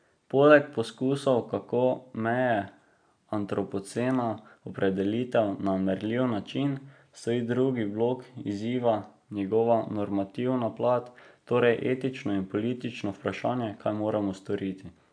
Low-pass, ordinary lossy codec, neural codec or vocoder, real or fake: 9.9 kHz; AAC, 64 kbps; none; real